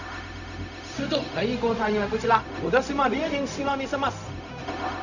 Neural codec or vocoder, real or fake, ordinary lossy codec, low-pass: codec, 16 kHz, 0.4 kbps, LongCat-Audio-Codec; fake; none; 7.2 kHz